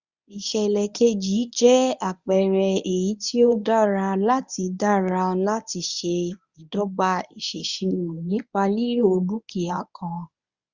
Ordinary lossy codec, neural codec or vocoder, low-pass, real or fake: Opus, 64 kbps; codec, 24 kHz, 0.9 kbps, WavTokenizer, medium speech release version 1; 7.2 kHz; fake